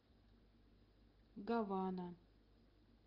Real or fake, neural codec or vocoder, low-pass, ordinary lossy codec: real; none; 5.4 kHz; Opus, 24 kbps